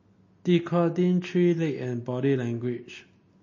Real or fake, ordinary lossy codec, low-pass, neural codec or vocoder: real; MP3, 32 kbps; 7.2 kHz; none